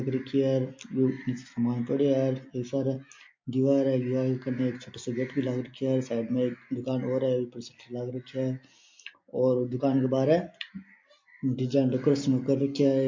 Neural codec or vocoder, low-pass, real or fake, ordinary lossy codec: none; 7.2 kHz; real; MP3, 48 kbps